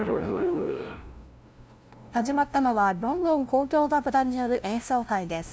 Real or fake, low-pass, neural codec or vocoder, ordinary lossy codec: fake; none; codec, 16 kHz, 0.5 kbps, FunCodec, trained on LibriTTS, 25 frames a second; none